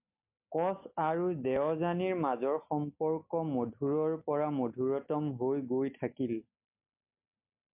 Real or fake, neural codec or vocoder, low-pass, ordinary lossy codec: real; none; 3.6 kHz; MP3, 32 kbps